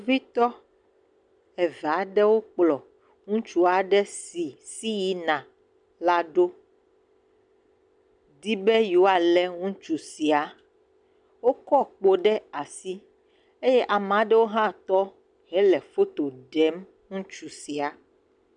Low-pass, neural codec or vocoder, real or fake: 9.9 kHz; none; real